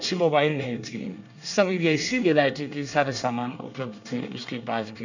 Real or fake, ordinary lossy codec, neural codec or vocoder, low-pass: fake; none; codec, 24 kHz, 1 kbps, SNAC; 7.2 kHz